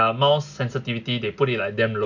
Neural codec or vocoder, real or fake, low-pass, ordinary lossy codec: none; real; 7.2 kHz; none